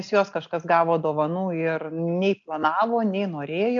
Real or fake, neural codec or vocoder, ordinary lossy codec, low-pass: real; none; MP3, 64 kbps; 7.2 kHz